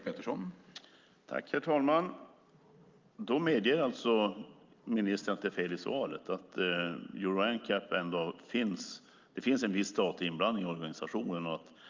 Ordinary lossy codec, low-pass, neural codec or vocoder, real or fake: Opus, 24 kbps; 7.2 kHz; none; real